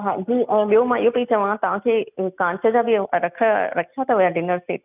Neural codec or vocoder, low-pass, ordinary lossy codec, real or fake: none; 3.6 kHz; none; real